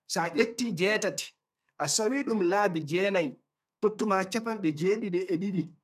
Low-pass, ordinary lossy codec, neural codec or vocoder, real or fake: 14.4 kHz; none; codec, 32 kHz, 1.9 kbps, SNAC; fake